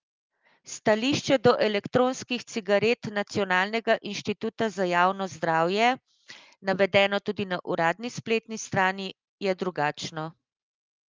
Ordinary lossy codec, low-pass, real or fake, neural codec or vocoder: Opus, 32 kbps; 7.2 kHz; real; none